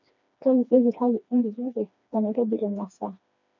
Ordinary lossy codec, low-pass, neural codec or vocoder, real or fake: none; 7.2 kHz; codec, 16 kHz, 2 kbps, FreqCodec, smaller model; fake